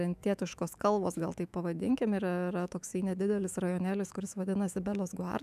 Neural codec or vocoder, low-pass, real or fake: autoencoder, 48 kHz, 128 numbers a frame, DAC-VAE, trained on Japanese speech; 14.4 kHz; fake